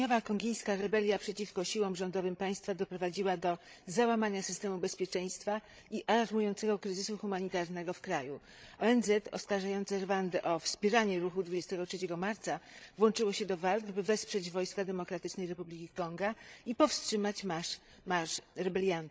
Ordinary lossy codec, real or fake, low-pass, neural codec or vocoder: none; fake; none; codec, 16 kHz, 16 kbps, FreqCodec, larger model